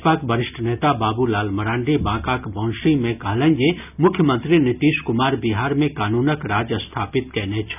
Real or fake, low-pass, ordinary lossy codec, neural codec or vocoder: real; 3.6 kHz; none; none